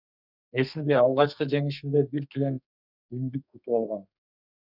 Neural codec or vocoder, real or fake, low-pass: codec, 32 kHz, 1.9 kbps, SNAC; fake; 5.4 kHz